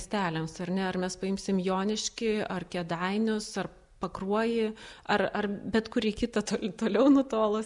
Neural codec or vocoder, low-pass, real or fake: none; 10.8 kHz; real